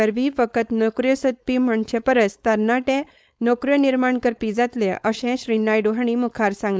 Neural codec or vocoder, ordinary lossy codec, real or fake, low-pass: codec, 16 kHz, 4.8 kbps, FACodec; none; fake; none